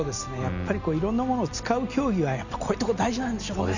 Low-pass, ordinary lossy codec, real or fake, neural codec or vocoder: 7.2 kHz; none; real; none